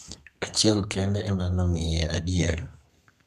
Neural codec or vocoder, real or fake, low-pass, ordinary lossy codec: codec, 32 kHz, 1.9 kbps, SNAC; fake; 14.4 kHz; none